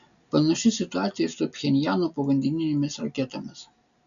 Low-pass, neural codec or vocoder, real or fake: 7.2 kHz; none; real